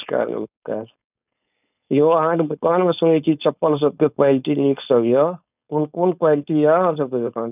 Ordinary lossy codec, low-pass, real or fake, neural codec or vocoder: none; 3.6 kHz; fake; codec, 16 kHz, 4.8 kbps, FACodec